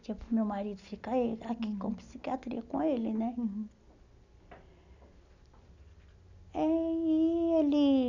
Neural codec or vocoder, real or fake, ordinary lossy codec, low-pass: none; real; none; 7.2 kHz